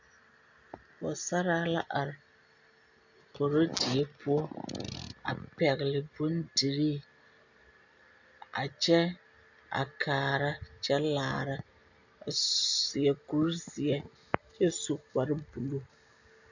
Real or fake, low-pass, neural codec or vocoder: real; 7.2 kHz; none